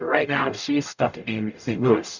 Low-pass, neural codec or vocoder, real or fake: 7.2 kHz; codec, 44.1 kHz, 0.9 kbps, DAC; fake